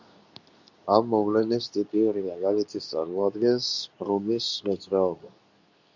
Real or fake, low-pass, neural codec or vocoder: fake; 7.2 kHz; codec, 24 kHz, 0.9 kbps, WavTokenizer, medium speech release version 2